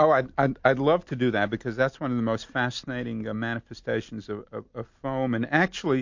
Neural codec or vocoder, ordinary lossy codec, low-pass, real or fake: none; MP3, 48 kbps; 7.2 kHz; real